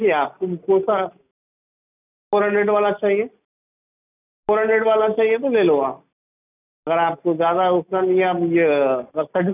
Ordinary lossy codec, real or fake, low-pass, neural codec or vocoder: none; real; 3.6 kHz; none